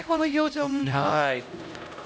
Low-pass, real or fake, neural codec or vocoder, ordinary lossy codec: none; fake; codec, 16 kHz, 0.5 kbps, X-Codec, HuBERT features, trained on LibriSpeech; none